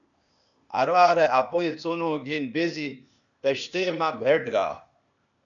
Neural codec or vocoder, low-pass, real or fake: codec, 16 kHz, 0.8 kbps, ZipCodec; 7.2 kHz; fake